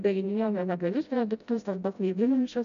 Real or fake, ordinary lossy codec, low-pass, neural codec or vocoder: fake; MP3, 96 kbps; 7.2 kHz; codec, 16 kHz, 0.5 kbps, FreqCodec, smaller model